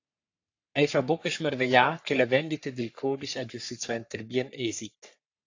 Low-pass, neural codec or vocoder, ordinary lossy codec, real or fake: 7.2 kHz; codec, 44.1 kHz, 3.4 kbps, Pupu-Codec; AAC, 48 kbps; fake